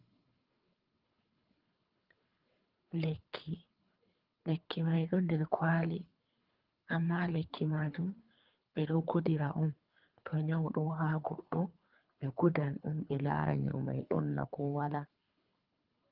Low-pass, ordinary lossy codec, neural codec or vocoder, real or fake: 5.4 kHz; Opus, 24 kbps; codec, 24 kHz, 3 kbps, HILCodec; fake